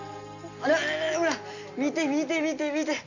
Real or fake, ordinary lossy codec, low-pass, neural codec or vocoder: real; none; 7.2 kHz; none